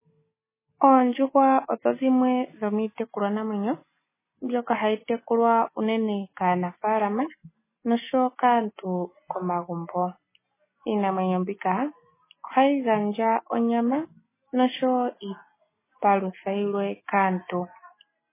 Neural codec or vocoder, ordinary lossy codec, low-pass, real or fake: none; MP3, 16 kbps; 3.6 kHz; real